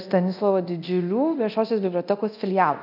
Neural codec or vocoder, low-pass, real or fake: codec, 24 kHz, 0.9 kbps, DualCodec; 5.4 kHz; fake